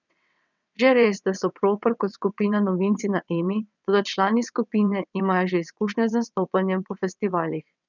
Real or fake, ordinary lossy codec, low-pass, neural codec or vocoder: fake; none; 7.2 kHz; vocoder, 22.05 kHz, 80 mel bands, WaveNeXt